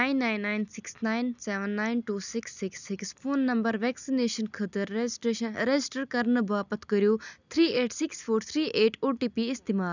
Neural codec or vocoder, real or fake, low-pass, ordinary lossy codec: none; real; 7.2 kHz; none